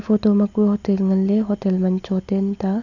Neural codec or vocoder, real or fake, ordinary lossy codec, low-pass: none; real; none; 7.2 kHz